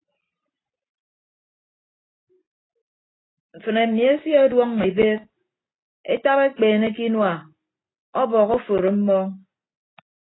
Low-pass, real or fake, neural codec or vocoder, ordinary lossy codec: 7.2 kHz; real; none; AAC, 16 kbps